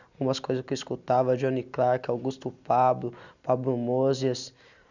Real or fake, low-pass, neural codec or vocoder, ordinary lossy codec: real; 7.2 kHz; none; none